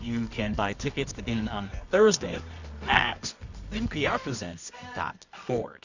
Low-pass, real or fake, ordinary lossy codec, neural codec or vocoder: 7.2 kHz; fake; Opus, 64 kbps; codec, 24 kHz, 0.9 kbps, WavTokenizer, medium music audio release